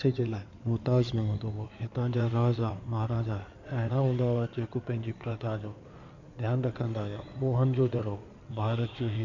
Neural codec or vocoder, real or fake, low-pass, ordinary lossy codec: codec, 16 kHz in and 24 kHz out, 2.2 kbps, FireRedTTS-2 codec; fake; 7.2 kHz; none